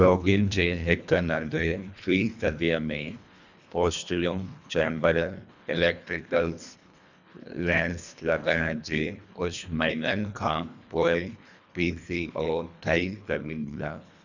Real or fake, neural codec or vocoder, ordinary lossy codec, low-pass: fake; codec, 24 kHz, 1.5 kbps, HILCodec; none; 7.2 kHz